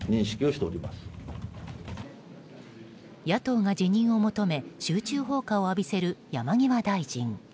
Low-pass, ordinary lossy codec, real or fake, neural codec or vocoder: none; none; real; none